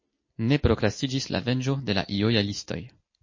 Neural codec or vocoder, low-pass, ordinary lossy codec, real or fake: none; 7.2 kHz; MP3, 32 kbps; real